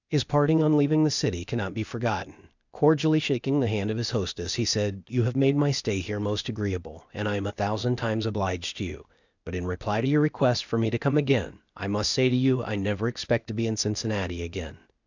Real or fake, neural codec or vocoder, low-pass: fake; codec, 16 kHz, 0.8 kbps, ZipCodec; 7.2 kHz